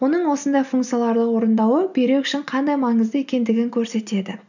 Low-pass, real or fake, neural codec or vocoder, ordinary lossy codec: 7.2 kHz; real; none; none